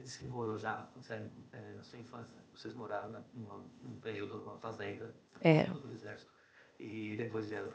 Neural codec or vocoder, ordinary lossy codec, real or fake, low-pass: codec, 16 kHz, 0.8 kbps, ZipCodec; none; fake; none